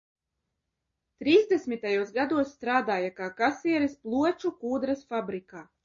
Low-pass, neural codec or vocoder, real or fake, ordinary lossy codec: 7.2 kHz; none; real; MP3, 32 kbps